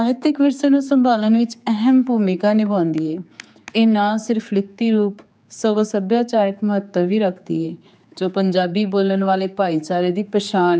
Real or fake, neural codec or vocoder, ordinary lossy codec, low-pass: fake; codec, 16 kHz, 4 kbps, X-Codec, HuBERT features, trained on general audio; none; none